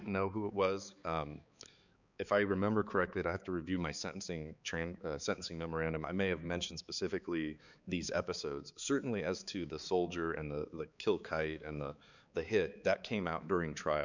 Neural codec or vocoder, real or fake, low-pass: codec, 16 kHz, 4 kbps, X-Codec, HuBERT features, trained on balanced general audio; fake; 7.2 kHz